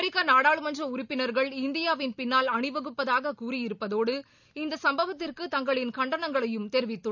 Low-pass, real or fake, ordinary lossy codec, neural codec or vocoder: 7.2 kHz; real; none; none